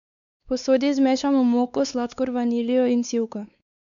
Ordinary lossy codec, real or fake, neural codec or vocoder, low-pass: none; fake; codec, 16 kHz, 4 kbps, X-Codec, WavLM features, trained on Multilingual LibriSpeech; 7.2 kHz